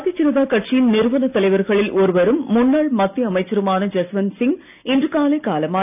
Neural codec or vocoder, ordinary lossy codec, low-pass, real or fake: none; none; 3.6 kHz; real